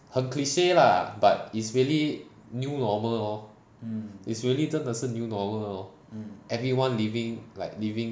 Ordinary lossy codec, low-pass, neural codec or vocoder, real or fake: none; none; none; real